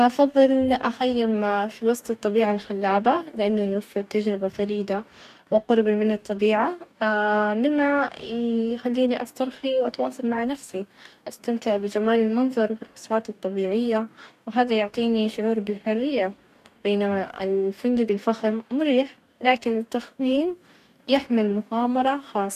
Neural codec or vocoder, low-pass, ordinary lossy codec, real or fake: codec, 44.1 kHz, 2.6 kbps, DAC; 14.4 kHz; none; fake